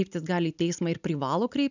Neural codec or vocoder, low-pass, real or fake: none; 7.2 kHz; real